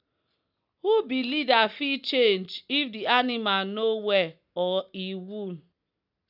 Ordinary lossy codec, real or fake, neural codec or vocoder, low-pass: none; real; none; 5.4 kHz